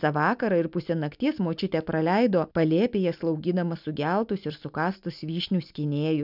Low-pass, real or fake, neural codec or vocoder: 5.4 kHz; real; none